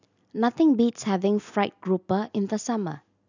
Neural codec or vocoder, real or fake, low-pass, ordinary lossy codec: none; real; 7.2 kHz; none